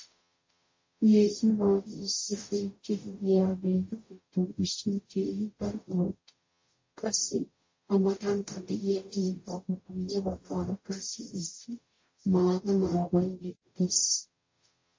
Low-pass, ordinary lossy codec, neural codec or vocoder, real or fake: 7.2 kHz; MP3, 32 kbps; codec, 44.1 kHz, 0.9 kbps, DAC; fake